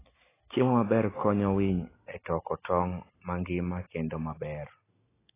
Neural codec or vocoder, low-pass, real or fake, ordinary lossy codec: none; 3.6 kHz; real; AAC, 16 kbps